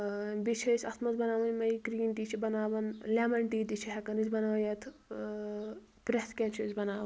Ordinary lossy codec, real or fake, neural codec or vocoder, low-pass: none; real; none; none